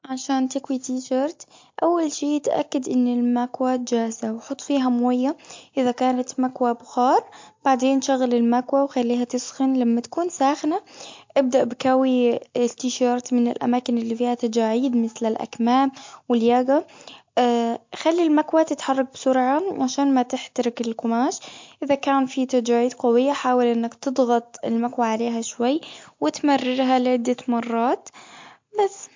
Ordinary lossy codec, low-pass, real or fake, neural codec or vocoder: MP3, 48 kbps; 7.2 kHz; real; none